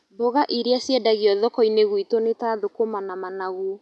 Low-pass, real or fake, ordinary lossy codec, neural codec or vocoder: none; real; none; none